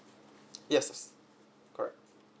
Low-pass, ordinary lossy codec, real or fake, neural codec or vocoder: none; none; real; none